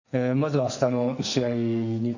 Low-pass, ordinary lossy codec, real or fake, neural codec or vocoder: 7.2 kHz; none; fake; codec, 44.1 kHz, 2.6 kbps, SNAC